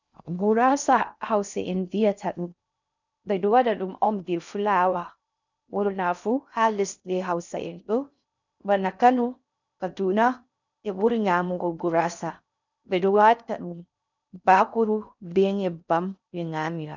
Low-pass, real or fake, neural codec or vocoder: 7.2 kHz; fake; codec, 16 kHz in and 24 kHz out, 0.6 kbps, FocalCodec, streaming, 2048 codes